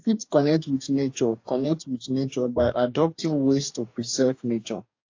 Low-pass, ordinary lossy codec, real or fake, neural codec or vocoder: 7.2 kHz; AAC, 48 kbps; fake; codec, 44.1 kHz, 2.6 kbps, DAC